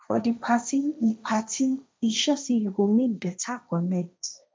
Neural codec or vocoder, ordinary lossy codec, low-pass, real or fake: codec, 16 kHz, 1.1 kbps, Voila-Tokenizer; none; none; fake